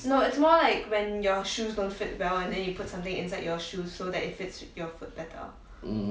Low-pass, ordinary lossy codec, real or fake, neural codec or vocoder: none; none; real; none